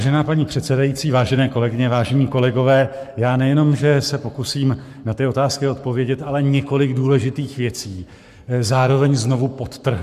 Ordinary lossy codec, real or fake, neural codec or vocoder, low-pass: MP3, 96 kbps; fake; codec, 44.1 kHz, 7.8 kbps, Pupu-Codec; 14.4 kHz